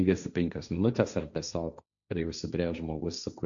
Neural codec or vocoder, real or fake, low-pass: codec, 16 kHz, 1.1 kbps, Voila-Tokenizer; fake; 7.2 kHz